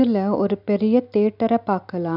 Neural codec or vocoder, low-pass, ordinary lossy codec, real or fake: none; 5.4 kHz; none; real